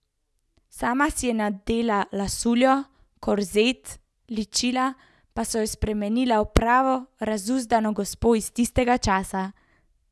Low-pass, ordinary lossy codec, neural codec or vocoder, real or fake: none; none; none; real